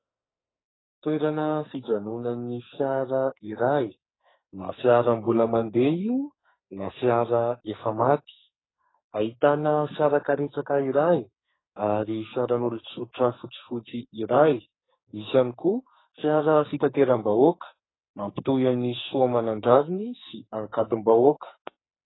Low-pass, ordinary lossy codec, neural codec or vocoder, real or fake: 7.2 kHz; AAC, 16 kbps; codec, 44.1 kHz, 2.6 kbps, SNAC; fake